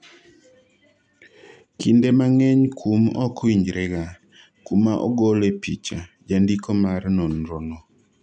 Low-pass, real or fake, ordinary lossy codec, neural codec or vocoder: 9.9 kHz; real; none; none